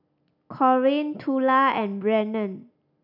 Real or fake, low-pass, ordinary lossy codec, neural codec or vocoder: real; 5.4 kHz; none; none